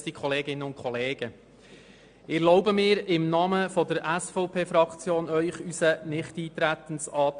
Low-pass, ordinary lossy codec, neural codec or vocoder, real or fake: 9.9 kHz; MP3, 64 kbps; none; real